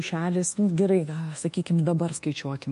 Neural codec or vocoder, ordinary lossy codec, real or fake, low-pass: codec, 24 kHz, 1.2 kbps, DualCodec; MP3, 48 kbps; fake; 10.8 kHz